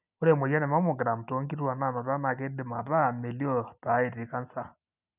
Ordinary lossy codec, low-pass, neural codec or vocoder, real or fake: none; 3.6 kHz; none; real